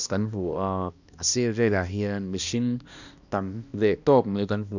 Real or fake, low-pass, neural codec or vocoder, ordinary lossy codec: fake; 7.2 kHz; codec, 16 kHz, 1 kbps, X-Codec, HuBERT features, trained on balanced general audio; none